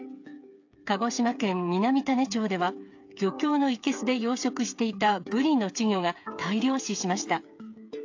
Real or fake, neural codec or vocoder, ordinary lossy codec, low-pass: fake; codec, 16 kHz, 8 kbps, FreqCodec, smaller model; AAC, 48 kbps; 7.2 kHz